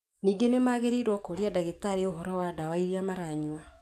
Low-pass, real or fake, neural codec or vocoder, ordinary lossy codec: 14.4 kHz; fake; codec, 44.1 kHz, 7.8 kbps, DAC; MP3, 96 kbps